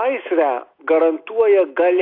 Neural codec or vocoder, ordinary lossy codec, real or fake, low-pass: none; AAC, 32 kbps; real; 5.4 kHz